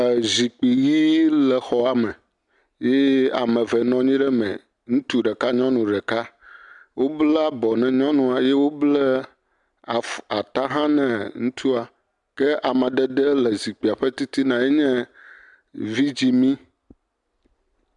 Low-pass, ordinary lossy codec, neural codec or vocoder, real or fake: 10.8 kHz; MP3, 96 kbps; none; real